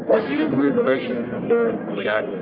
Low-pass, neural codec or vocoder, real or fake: 5.4 kHz; codec, 44.1 kHz, 1.7 kbps, Pupu-Codec; fake